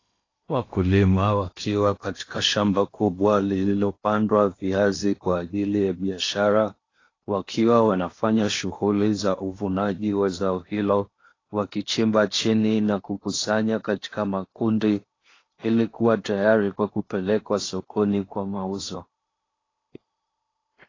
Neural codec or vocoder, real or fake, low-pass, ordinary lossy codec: codec, 16 kHz in and 24 kHz out, 0.8 kbps, FocalCodec, streaming, 65536 codes; fake; 7.2 kHz; AAC, 32 kbps